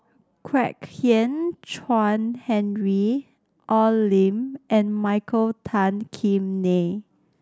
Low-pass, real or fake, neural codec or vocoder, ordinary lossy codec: none; real; none; none